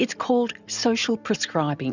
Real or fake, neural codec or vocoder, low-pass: real; none; 7.2 kHz